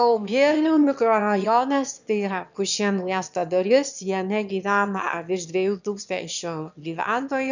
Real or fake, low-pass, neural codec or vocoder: fake; 7.2 kHz; autoencoder, 22.05 kHz, a latent of 192 numbers a frame, VITS, trained on one speaker